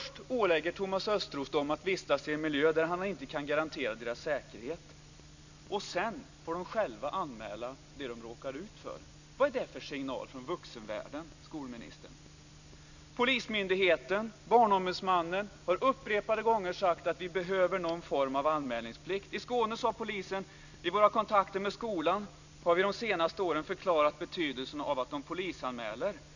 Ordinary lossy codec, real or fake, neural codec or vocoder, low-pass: none; real; none; 7.2 kHz